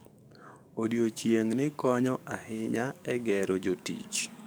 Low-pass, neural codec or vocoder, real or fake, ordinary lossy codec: none; codec, 44.1 kHz, 7.8 kbps, DAC; fake; none